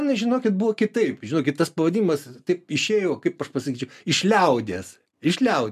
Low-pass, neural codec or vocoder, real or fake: 14.4 kHz; none; real